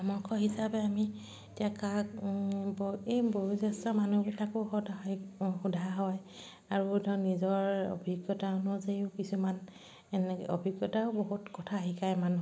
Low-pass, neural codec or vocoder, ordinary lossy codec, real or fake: none; none; none; real